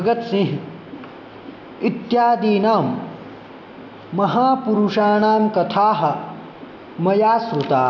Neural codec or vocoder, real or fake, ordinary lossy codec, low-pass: none; real; none; 7.2 kHz